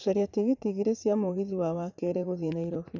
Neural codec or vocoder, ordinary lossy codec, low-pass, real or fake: vocoder, 44.1 kHz, 80 mel bands, Vocos; none; 7.2 kHz; fake